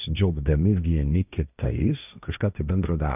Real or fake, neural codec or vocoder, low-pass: fake; codec, 16 kHz, 1.1 kbps, Voila-Tokenizer; 3.6 kHz